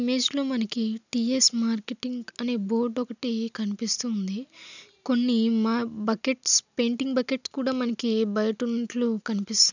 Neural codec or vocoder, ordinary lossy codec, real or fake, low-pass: none; none; real; 7.2 kHz